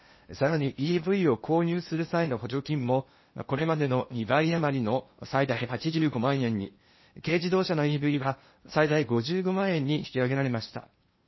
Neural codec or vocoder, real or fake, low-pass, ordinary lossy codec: codec, 16 kHz in and 24 kHz out, 0.8 kbps, FocalCodec, streaming, 65536 codes; fake; 7.2 kHz; MP3, 24 kbps